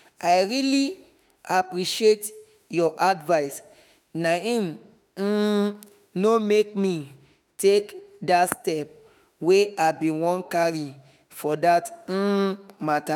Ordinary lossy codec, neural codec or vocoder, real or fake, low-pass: none; autoencoder, 48 kHz, 32 numbers a frame, DAC-VAE, trained on Japanese speech; fake; 19.8 kHz